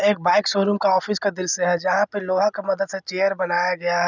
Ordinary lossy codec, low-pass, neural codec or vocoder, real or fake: none; 7.2 kHz; none; real